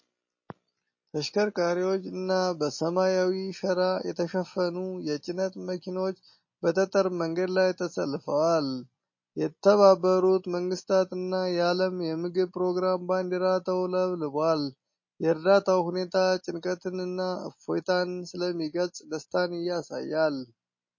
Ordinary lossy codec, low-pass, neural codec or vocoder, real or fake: MP3, 32 kbps; 7.2 kHz; none; real